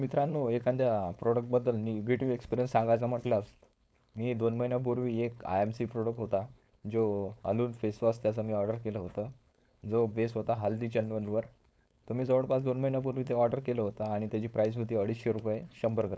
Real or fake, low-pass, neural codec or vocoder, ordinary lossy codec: fake; none; codec, 16 kHz, 4.8 kbps, FACodec; none